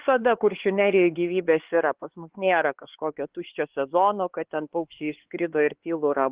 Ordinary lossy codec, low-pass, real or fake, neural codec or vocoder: Opus, 16 kbps; 3.6 kHz; fake; codec, 16 kHz, 4 kbps, X-Codec, HuBERT features, trained on LibriSpeech